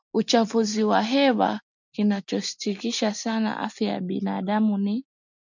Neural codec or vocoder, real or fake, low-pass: none; real; 7.2 kHz